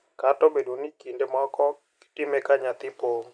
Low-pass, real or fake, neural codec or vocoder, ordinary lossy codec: 9.9 kHz; real; none; none